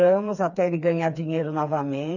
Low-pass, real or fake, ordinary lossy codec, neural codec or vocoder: 7.2 kHz; fake; none; codec, 16 kHz, 4 kbps, FreqCodec, smaller model